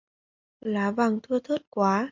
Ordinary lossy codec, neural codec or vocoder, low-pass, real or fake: MP3, 64 kbps; none; 7.2 kHz; real